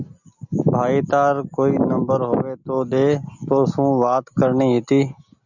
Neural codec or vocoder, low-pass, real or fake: none; 7.2 kHz; real